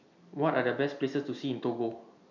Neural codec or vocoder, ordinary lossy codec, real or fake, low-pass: none; none; real; 7.2 kHz